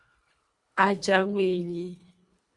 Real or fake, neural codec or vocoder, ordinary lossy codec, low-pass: fake; codec, 24 kHz, 1.5 kbps, HILCodec; Opus, 64 kbps; 10.8 kHz